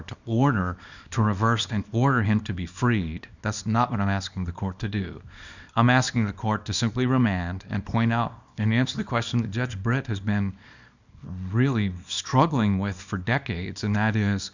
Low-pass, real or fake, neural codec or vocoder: 7.2 kHz; fake; codec, 24 kHz, 0.9 kbps, WavTokenizer, small release